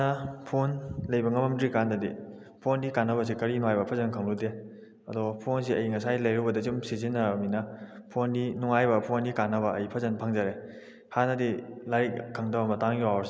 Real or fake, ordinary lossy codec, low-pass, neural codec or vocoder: real; none; none; none